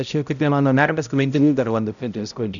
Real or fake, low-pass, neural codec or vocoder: fake; 7.2 kHz; codec, 16 kHz, 0.5 kbps, X-Codec, HuBERT features, trained on balanced general audio